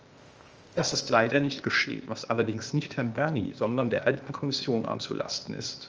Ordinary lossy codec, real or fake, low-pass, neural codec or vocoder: Opus, 24 kbps; fake; 7.2 kHz; codec, 16 kHz, 0.8 kbps, ZipCodec